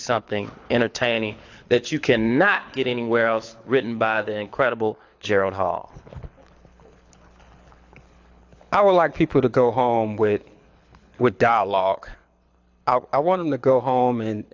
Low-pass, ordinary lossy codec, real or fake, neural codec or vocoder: 7.2 kHz; AAC, 48 kbps; fake; codec, 24 kHz, 6 kbps, HILCodec